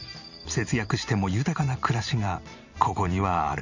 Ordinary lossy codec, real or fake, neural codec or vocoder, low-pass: none; fake; vocoder, 44.1 kHz, 128 mel bands every 512 samples, BigVGAN v2; 7.2 kHz